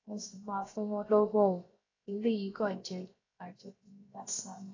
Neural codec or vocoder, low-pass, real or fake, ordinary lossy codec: codec, 16 kHz, 0.7 kbps, FocalCodec; 7.2 kHz; fake; AAC, 32 kbps